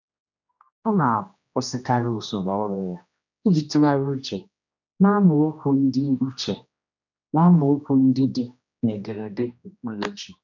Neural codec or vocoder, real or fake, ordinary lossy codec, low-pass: codec, 16 kHz, 1 kbps, X-Codec, HuBERT features, trained on general audio; fake; none; 7.2 kHz